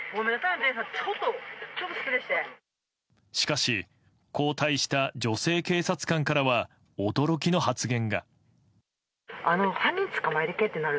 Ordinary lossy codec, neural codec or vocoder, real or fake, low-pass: none; none; real; none